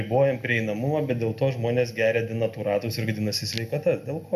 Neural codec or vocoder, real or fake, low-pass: none; real; 14.4 kHz